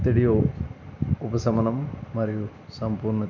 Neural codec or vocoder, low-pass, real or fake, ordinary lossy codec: none; 7.2 kHz; real; none